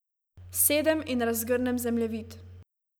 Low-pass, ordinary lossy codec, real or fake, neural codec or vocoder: none; none; real; none